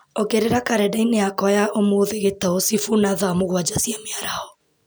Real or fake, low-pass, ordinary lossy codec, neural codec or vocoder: real; none; none; none